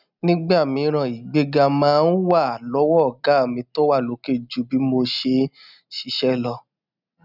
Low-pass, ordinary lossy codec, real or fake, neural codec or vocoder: 5.4 kHz; none; real; none